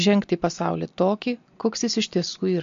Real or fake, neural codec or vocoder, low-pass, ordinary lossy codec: real; none; 7.2 kHz; MP3, 48 kbps